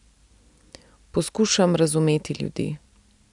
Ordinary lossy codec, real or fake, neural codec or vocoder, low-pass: none; real; none; 10.8 kHz